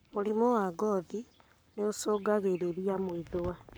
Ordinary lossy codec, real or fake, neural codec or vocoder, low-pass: none; fake; codec, 44.1 kHz, 7.8 kbps, Pupu-Codec; none